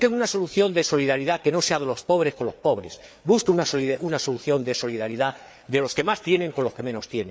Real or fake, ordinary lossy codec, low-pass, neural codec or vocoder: fake; none; none; codec, 16 kHz, 4 kbps, FreqCodec, larger model